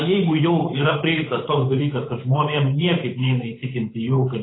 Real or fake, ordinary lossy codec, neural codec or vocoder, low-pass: fake; AAC, 16 kbps; codec, 16 kHz, 8 kbps, FunCodec, trained on Chinese and English, 25 frames a second; 7.2 kHz